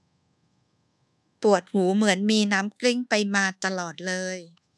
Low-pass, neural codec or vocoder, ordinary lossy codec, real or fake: none; codec, 24 kHz, 1.2 kbps, DualCodec; none; fake